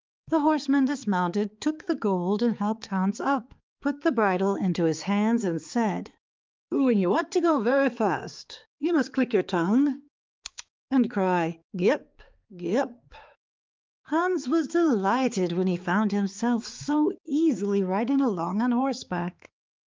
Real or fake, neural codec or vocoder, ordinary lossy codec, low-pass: fake; codec, 16 kHz, 4 kbps, X-Codec, HuBERT features, trained on balanced general audio; Opus, 24 kbps; 7.2 kHz